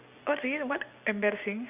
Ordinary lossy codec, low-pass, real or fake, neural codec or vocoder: Opus, 64 kbps; 3.6 kHz; real; none